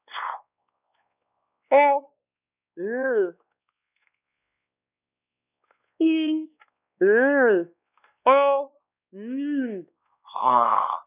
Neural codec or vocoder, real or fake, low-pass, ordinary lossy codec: codec, 16 kHz, 2 kbps, X-Codec, WavLM features, trained on Multilingual LibriSpeech; fake; 3.6 kHz; none